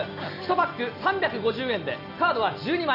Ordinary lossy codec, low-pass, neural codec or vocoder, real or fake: none; 5.4 kHz; none; real